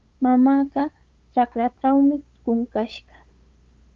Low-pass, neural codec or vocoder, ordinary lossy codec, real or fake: 7.2 kHz; codec, 16 kHz, 8 kbps, FunCodec, trained on LibriTTS, 25 frames a second; Opus, 32 kbps; fake